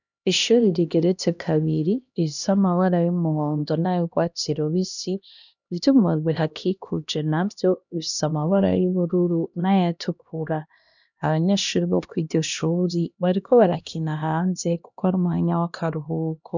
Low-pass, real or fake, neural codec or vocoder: 7.2 kHz; fake; codec, 16 kHz, 1 kbps, X-Codec, HuBERT features, trained on LibriSpeech